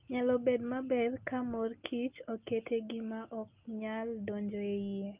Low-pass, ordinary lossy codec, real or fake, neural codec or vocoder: 3.6 kHz; Opus, 24 kbps; real; none